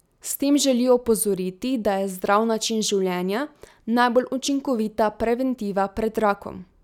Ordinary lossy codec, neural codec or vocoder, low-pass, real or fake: none; none; 19.8 kHz; real